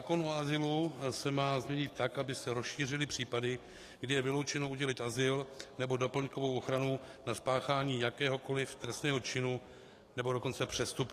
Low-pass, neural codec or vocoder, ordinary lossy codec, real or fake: 14.4 kHz; codec, 44.1 kHz, 7.8 kbps, DAC; AAC, 48 kbps; fake